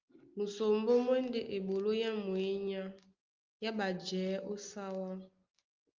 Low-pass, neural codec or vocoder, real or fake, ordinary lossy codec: 7.2 kHz; none; real; Opus, 32 kbps